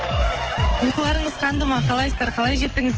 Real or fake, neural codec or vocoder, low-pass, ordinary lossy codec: fake; codec, 44.1 kHz, 7.8 kbps, DAC; 7.2 kHz; Opus, 16 kbps